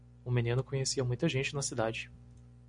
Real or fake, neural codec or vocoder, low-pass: real; none; 9.9 kHz